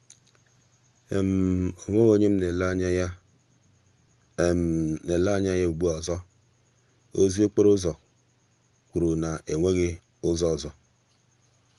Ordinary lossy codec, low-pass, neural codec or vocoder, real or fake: Opus, 32 kbps; 10.8 kHz; none; real